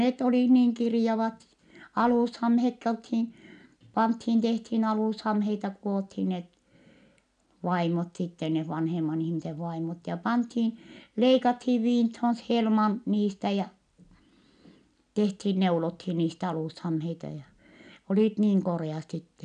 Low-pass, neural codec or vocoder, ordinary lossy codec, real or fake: 9.9 kHz; none; none; real